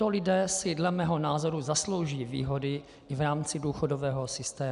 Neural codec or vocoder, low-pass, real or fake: none; 10.8 kHz; real